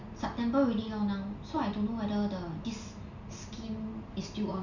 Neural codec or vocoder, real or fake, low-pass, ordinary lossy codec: none; real; 7.2 kHz; none